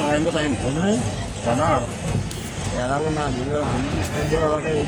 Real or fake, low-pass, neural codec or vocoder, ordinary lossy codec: fake; none; codec, 44.1 kHz, 3.4 kbps, Pupu-Codec; none